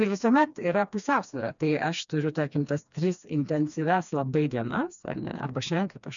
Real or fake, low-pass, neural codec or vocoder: fake; 7.2 kHz; codec, 16 kHz, 2 kbps, FreqCodec, smaller model